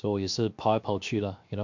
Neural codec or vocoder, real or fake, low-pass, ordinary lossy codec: codec, 16 kHz, 0.7 kbps, FocalCodec; fake; 7.2 kHz; MP3, 64 kbps